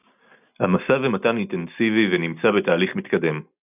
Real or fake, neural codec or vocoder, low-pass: real; none; 3.6 kHz